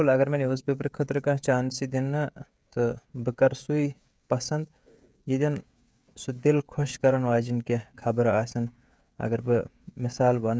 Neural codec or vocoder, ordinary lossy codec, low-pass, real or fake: codec, 16 kHz, 16 kbps, FreqCodec, smaller model; none; none; fake